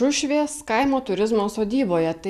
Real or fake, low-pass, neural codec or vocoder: real; 14.4 kHz; none